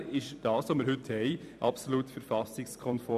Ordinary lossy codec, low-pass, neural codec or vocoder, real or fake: none; 14.4 kHz; vocoder, 44.1 kHz, 128 mel bands every 256 samples, BigVGAN v2; fake